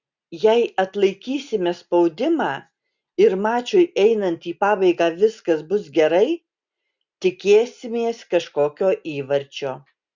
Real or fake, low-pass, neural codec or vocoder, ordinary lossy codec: real; 7.2 kHz; none; Opus, 64 kbps